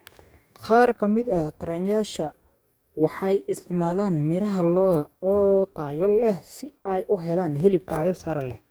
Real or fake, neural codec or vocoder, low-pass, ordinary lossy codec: fake; codec, 44.1 kHz, 2.6 kbps, DAC; none; none